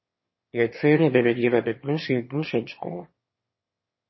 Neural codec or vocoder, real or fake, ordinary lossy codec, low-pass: autoencoder, 22.05 kHz, a latent of 192 numbers a frame, VITS, trained on one speaker; fake; MP3, 24 kbps; 7.2 kHz